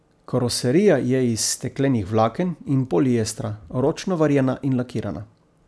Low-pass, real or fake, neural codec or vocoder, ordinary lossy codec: none; real; none; none